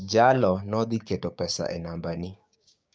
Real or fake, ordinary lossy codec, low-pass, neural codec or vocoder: fake; none; none; codec, 16 kHz, 6 kbps, DAC